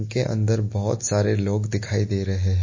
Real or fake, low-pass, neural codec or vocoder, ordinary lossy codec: real; 7.2 kHz; none; MP3, 32 kbps